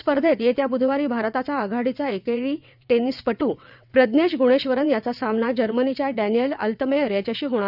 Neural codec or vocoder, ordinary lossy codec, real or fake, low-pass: vocoder, 22.05 kHz, 80 mel bands, WaveNeXt; none; fake; 5.4 kHz